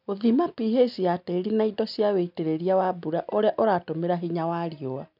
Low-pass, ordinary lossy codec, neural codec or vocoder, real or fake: 5.4 kHz; none; none; real